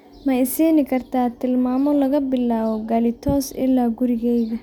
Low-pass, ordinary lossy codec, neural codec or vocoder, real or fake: 19.8 kHz; none; none; real